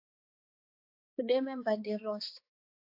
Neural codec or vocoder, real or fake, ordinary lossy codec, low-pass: codec, 16 kHz, 2 kbps, X-Codec, HuBERT features, trained on balanced general audio; fake; AAC, 48 kbps; 5.4 kHz